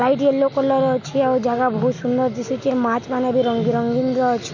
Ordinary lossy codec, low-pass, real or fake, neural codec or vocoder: none; 7.2 kHz; real; none